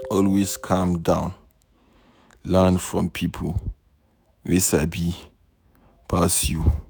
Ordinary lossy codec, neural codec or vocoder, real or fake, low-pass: none; autoencoder, 48 kHz, 128 numbers a frame, DAC-VAE, trained on Japanese speech; fake; none